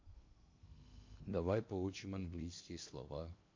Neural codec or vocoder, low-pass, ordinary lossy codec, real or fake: codec, 16 kHz in and 24 kHz out, 0.8 kbps, FocalCodec, streaming, 65536 codes; 7.2 kHz; MP3, 48 kbps; fake